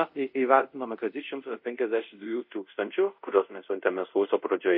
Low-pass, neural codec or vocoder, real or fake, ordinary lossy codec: 5.4 kHz; codec, 24 kHz, 0.5 kbps, DualCodec; fake; MP3, 32 kbps